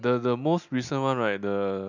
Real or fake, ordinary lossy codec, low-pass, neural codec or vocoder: real; none; 7.2 kHz; none